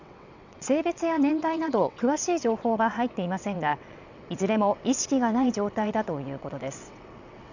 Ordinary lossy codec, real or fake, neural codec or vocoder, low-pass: none; fake; vocoder, 22.05 kHz, 80 mel bands, WaveNeXt; 7.2 kHz